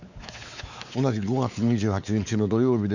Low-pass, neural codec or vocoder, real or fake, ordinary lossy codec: 7.2 kHz; codec, 16 kHz, 4 kbps, X-Codec, WavLM features, trained on Multilingual LibriSpeech; fake; none